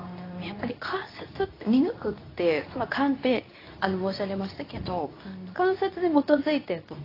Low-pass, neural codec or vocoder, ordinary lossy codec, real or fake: 5.4 kHz; codec, 24 kHz, 0.9 kbps, WavTokenizer, medium speech release version 2; AAC, 24 kbps; fake